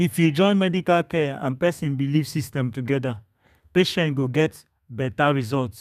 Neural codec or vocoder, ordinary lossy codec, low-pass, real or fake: codec, 32 kHz, 1.9 kbps, SNAC; none; 14.4 kHz; fake